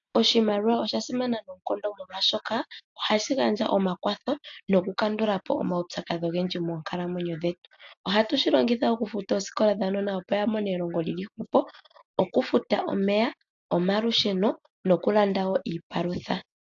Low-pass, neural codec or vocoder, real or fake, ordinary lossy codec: 7.2 kHz; none; real; AAC, 64 kbps